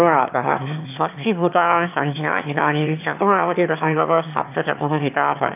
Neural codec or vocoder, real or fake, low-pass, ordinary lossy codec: autoencoder, 22.05 kHz, a latent of 192 numbers a frame, VITS, trained on one speaker; fake; 3.6 kHz; none